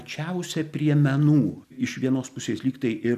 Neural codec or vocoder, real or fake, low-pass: none; real; 14.4 kHz